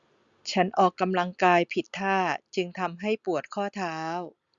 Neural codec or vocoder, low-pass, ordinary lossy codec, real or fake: none; 7.2 kHz; Opus, 64 kbps; real